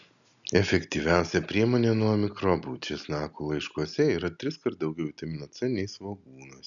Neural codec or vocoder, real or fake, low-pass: none; real; 7.2 kHz